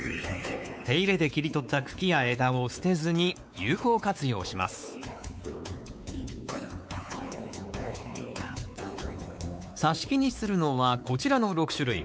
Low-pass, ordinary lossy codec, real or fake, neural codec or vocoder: none; none; fake; codec, 16 kHz, 4 kbps, X-Codec, WavLM features, trained on Multilingual LibriSpeech